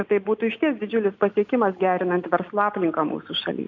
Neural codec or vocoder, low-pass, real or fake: none; 7.2 kHz; real